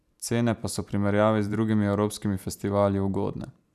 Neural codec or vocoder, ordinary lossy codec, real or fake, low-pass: vocoder, 44.1 kHz, 128 mel bands every 512 samples, BigVGAN v2; none; fake; 14.4 kHz